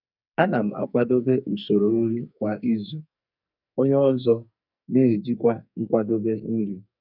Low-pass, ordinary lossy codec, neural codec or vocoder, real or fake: 5.4 kHz; none; codec, 44.1 kHz, 2.6 kbps, SNAC; fake